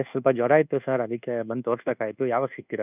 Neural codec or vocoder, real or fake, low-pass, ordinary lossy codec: codec, 24 kHz, 1.2 kbps, DualCodec; fake; 3.6 kHz; none